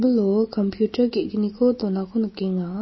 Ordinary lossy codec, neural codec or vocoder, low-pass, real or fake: MP3, 24 kbps; none; 7.2 kHz; real